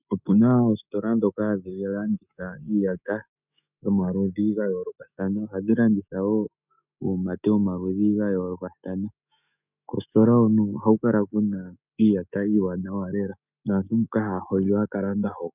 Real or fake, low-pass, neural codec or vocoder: fake; 3.6 kHz; autoencoder, 48 kHz, 128 numbers a frame, DAC-VAE, trained on Japanese speech